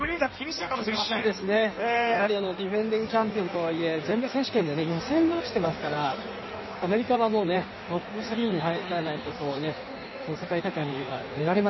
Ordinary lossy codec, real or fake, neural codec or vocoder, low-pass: MP3, 24 kbps; fake; codec, 16 kHz in and 24 kHz out, 1.1 kbps, FireRedTTS-2 codec; 7.2 kHz